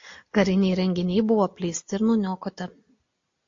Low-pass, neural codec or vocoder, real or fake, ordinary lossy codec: 7.2 kHz; none; real; AAC, 48 kbps